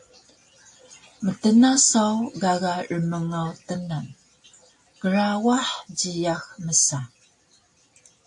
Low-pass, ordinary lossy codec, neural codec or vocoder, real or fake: 10.8 kHz; AAC, 64 kbps; none; real